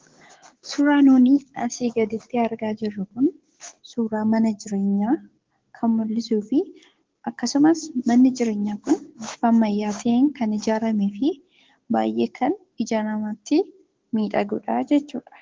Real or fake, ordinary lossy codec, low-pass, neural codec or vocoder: fake; Opus, 16 kbps; 7.2 kHz; codec, 16 kHz, 6 kbps, DAC